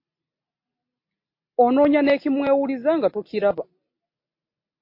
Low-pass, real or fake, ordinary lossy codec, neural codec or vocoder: 5.4 kHz; real; MP3, 32 kbps; none